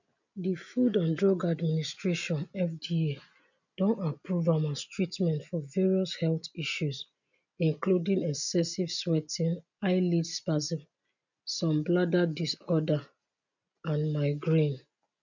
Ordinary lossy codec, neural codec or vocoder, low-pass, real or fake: none; none; 7.2 kHz; real